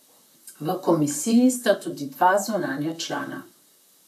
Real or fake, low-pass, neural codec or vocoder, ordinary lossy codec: fake; 14.4 kHz; vocoder, 44.1 kHz, 128 mel bands, Pupu-Vocoder; none